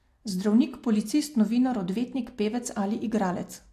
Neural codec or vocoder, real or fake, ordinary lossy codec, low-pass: none; real; AAC, 64 kbps; 14.4 kHz